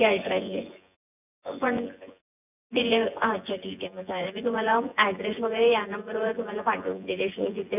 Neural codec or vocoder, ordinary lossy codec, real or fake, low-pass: vocoder, 24 kHz, 100 mel bands, Vocos; none; fake; 3.6 kHz